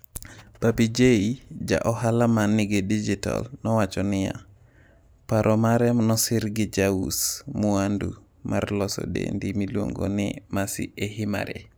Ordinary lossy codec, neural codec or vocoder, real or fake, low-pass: none; none; real; none